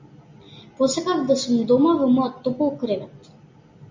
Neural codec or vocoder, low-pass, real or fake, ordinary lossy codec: none; 7.2 kHz; real; MP3, 64 kbps